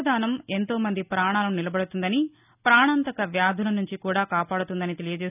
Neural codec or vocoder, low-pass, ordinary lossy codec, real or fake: none; 3.6 kHz; none; real